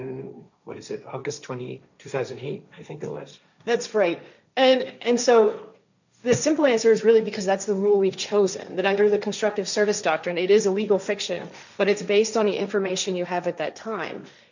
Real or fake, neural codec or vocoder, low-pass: fake; codec, 16 kHz, 1.1 kbps, Voila-Tokenizer; 7.2 kHz